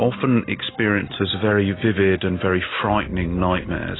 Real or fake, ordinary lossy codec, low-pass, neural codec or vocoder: real; AAC, 16 kbps; 7.2 kHz; none